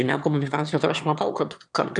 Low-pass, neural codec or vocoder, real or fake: 9.9 kHz; autoencoder, 22.05 kHz, a latent of 192 numbers a frame, VITS, trained on one speaker; fake